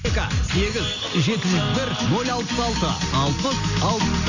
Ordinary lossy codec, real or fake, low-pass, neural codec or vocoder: none; real; 7.2 kHz; none